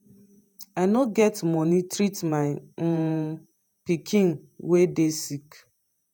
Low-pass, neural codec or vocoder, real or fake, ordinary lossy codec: none; vocoder, 48 kHz, 128 mel bands, Vocos; fake; none